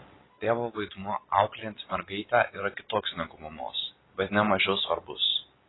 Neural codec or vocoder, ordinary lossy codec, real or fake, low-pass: none; AAC, 16 kbps; real; 7.2 kHz